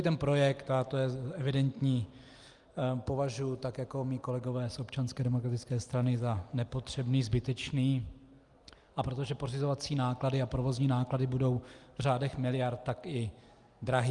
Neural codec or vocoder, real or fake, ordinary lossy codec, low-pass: none; real; Opus, 32 kbps; 10.8 kHz